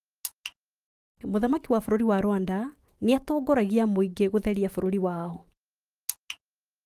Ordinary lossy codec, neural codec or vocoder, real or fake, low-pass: Opus, 24 kbps; autoencoder, 48 kHz, 128 numbers a frame, DAC-VAE, trained on Japanese speech; fake; 14.4 kHz